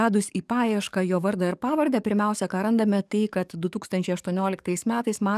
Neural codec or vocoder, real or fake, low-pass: codec, 44.1 kHz, 7.8 kbps, DAC; fake; 14.4 kHz